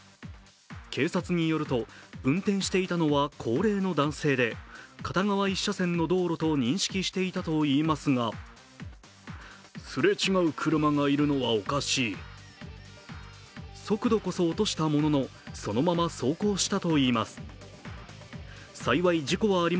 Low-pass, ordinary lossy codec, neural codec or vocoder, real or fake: none; none; none; real